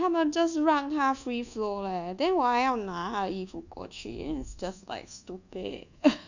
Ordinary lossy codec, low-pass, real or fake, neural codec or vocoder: none; 7.2 kHz; fake; codec, 24 kHz, 1.2 kbps, DualCodec